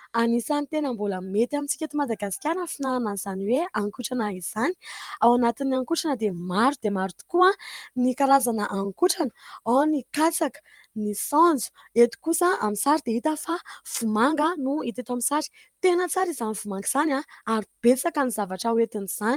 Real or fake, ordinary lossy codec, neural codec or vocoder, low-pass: fake; Opus, 32 kbps; vocoder, 44.1 kHz, 128 mel bands every 512 samples, BigVGAN v2; 19.8 kHz